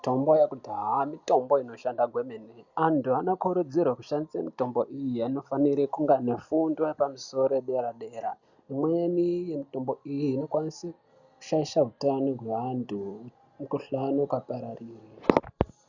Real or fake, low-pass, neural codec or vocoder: real; 7.2 kHz; none